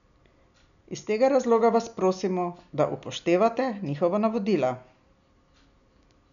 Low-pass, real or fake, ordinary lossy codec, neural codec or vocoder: 7.2 kHz; real; none; none